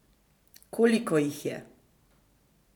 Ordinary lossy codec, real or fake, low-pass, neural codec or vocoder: none; fake; 19.8 kHz; vocoder, 44.1 kHz, 128 mel bands every 512 samples, BigVGAN v2